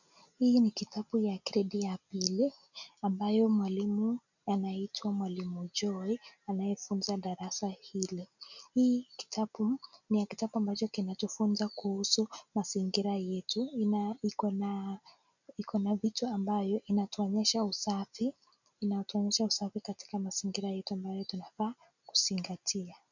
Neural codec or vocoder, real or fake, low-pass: none; real; 7.2 kHz